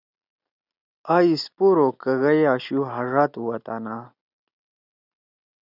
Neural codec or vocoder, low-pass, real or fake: none; 5.4 kHz; real